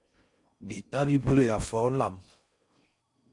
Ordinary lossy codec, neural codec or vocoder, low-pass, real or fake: MP3, 64 kbps; codec, 16 kHz in and 24 kHz out, 0.6 kbps, FocalCodec, streaming, 4096 codes; 10.8 kHz; fake